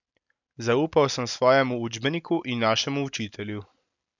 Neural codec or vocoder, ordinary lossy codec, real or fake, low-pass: none; none; real; 7.2 kHz